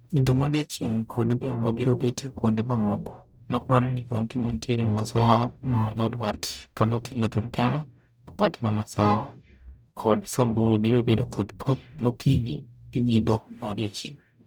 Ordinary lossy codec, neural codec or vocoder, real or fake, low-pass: none; codec, 44.1 kHz, 0.9 kbps, DAC; fake; none